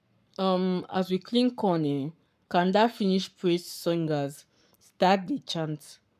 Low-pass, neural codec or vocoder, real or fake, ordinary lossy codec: 14.4 kHz; codec, 44.1 kHz, 7.8 kbps, Pupu-Codec; fake; none